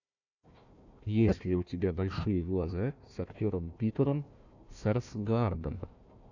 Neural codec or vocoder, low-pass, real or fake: codec, 16 kHz, 1 kbps, FunCodec, trained on Chinese and English, 50 frames a second; 7.2 kHz; fake